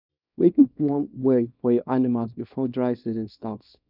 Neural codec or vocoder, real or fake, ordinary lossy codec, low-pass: codec, 24 kHz, 0.9 kbps, WavTokenizer, small release; fake; none; 5.4 kHz